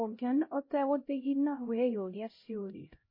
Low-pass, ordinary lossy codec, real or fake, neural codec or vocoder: 5.4 kHz; MP3, 24 kbps; fake; codec, 16 kHz, 0.5 kbps, X-Codec, HuBERT features, trained on LibriSpeech